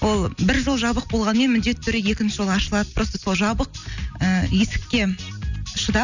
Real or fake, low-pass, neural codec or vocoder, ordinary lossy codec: real; 7.2 kHz; none; none